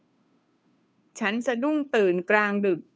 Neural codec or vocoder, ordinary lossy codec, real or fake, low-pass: codec, 16 kHz, 2 kbps, FunCodec, trained on Chinese and English, 25 frames a second; none; fake; none